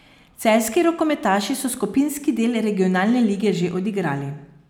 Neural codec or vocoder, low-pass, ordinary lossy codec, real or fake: none; 19.8 kHz; none; real